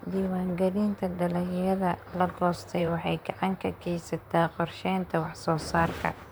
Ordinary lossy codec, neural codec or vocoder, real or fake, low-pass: none; vocoder, 44.1 kHz, 128 mel bands, Pupu-Vocoder; fake; none